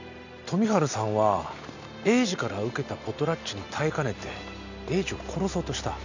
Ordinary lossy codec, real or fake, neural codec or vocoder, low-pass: none; real; none; 7.2 kHz